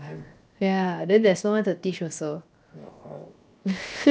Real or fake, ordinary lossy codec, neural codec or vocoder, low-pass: fake; none; codec, 16 kHz, 0.7 kbps, FocalCodec; none